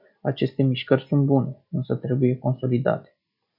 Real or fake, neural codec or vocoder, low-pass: real; none; 5.4 kHz